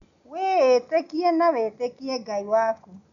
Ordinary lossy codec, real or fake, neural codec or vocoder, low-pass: none; real; none; 7.2 kHz